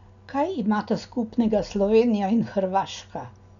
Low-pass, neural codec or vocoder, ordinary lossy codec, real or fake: 7.2 kHz; none; none; real